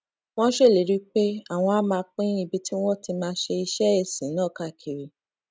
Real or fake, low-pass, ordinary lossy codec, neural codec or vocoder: real; none; none; none